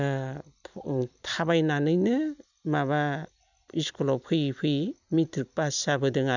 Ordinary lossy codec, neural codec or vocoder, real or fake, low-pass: none; vocoder, 44.1 kHz, 128 mel bands every 256 samples, BigVGAN v2; fake; 7.2 kHz